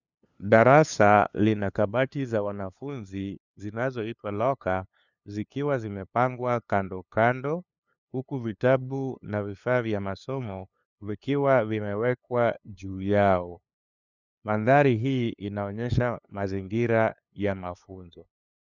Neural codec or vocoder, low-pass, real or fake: codec, 16 kHz, 2 kbps, FunCodec, trained on LibriTTS, 25 frames a second; 7.2 kHz; fake